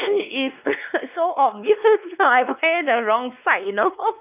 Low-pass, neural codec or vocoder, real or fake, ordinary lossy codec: 3.6 kHz; codec, 16 kHz, 2 kbps, X-Codec, WavLM features, trained on Multilingual LibriSpeech; fake; none